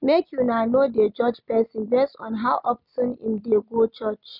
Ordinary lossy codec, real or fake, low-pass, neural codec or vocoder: none; real; 5.4 kHz; none